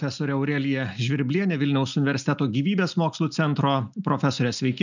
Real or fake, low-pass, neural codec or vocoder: real; 7.2 kHz; none